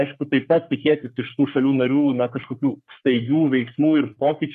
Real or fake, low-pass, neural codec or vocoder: fake; 14.4 kHz; codec, 44.1 kHz, 3.4 kbps, Pupu-Codec